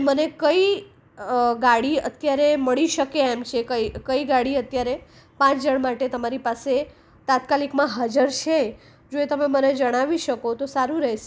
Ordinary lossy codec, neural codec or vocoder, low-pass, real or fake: none; none; none; real